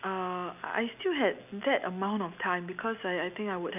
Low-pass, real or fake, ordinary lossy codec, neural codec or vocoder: 3.6 kHz; real; none; none